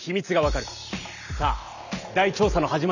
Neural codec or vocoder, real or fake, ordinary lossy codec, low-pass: none; real; none; 7.2 kHz